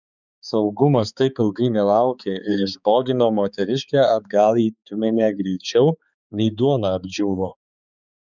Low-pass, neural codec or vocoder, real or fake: 7.2 kHz; codec, 16 kHz, 4 kbps, X-Codec, HuBERT features, trained on balanced general audio; fake